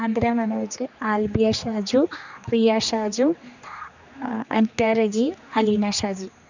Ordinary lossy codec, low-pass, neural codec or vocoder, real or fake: none; 7.2 kHz; codec, 16 kHz, 2 kbps, X-Codec, HuBERT features, trained on general audio; fake